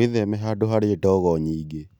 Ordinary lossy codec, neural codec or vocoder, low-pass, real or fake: none; none; 19.8 kHz; real